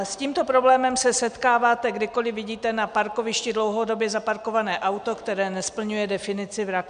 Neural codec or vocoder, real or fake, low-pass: none; real; 9.9 kHz